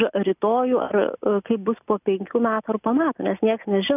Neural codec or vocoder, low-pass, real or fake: none; 3.6 kHz; real